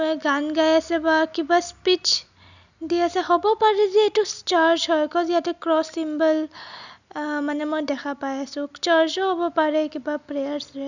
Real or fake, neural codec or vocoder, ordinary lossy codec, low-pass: real; none; none; 7.2 kHz